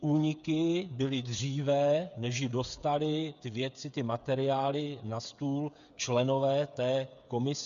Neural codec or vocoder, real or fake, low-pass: codec, 16 kHz, 8 kbps, FreqCodec, smaller model; fake; 7.2 kHz